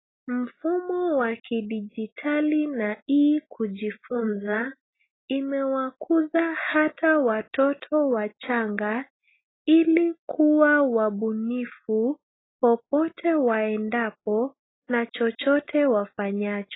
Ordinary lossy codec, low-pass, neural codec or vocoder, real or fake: AAC, 16 kbps; 7.2 kHz; none; real